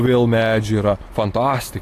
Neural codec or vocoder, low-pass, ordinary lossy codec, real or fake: none; 14.4 kHz; AAC, 48 kbps; real